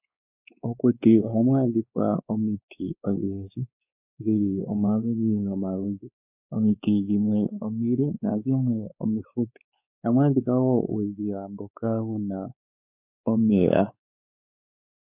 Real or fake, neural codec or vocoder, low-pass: fake; codec, 16 kHz, 4 kbps, X-Codec, WavLM features, trained on Multilingual LibriSpeech; 3.6 kHz